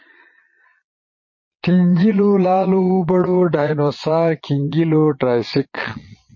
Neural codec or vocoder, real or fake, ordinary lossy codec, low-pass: vocoder, 22.05 kHz, 80 mel bands, Vocos; fake; MP3, 32 kbps; 7.2 kHz